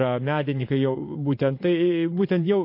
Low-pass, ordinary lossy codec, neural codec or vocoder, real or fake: 5.4 kHz; MP3, 32 kbps; vocoder, 22.05 kHz, 80 mel bands, Vocos; fake